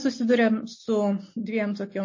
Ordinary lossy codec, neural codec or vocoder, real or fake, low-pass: MP3, 32 kbps; none; real; 7.2 kHz